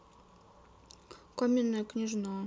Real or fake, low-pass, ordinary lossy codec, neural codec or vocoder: real; none; none; none